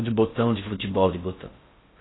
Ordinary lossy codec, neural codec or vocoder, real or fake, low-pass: AAC, 16 kbps; codec, 16 kHz in and 24 kHz out, 0.6 kbps, FocalCodec, streaming, 4096 codes; fake; 7.2 kHz